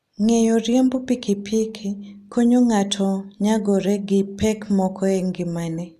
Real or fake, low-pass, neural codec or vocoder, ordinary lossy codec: real; 14.4 kHz; none; MP3, 96 kbps